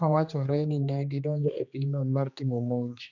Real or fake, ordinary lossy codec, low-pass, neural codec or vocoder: fake; none; 7.2 kHz; codec, 16 kHz, 2 kbps, X-Codec, HuBERT features, trained on general audio